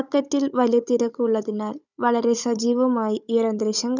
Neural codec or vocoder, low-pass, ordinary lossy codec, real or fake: codec, 16 kHz, 16 kbps, FunCodec, trained on Chinese and English, 50 frames a second; 7.2 kHz; none; fake